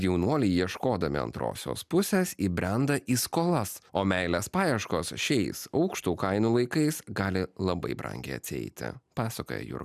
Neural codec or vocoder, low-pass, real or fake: none; 14.4 kHz; real